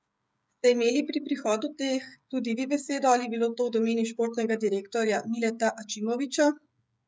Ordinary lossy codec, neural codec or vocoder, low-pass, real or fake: none; codec, 16 kHz, 16 kbps, FreqCodec, smaller model; none; fake